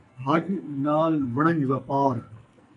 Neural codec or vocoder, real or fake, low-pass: codec, 44.1 kHz, 2.6 kbps, SNAC; fake; 10.8 kHz